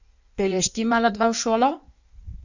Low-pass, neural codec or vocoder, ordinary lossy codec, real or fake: 7.2 kHz; codec, 16 kHz in and 24 kHz out, 1.1 kbps, FireRedTTS-2 codec; none; fake